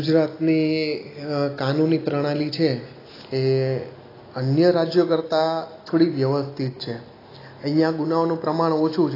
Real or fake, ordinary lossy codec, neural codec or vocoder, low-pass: real; AAC, 24 kbps; none; 5.4 kHz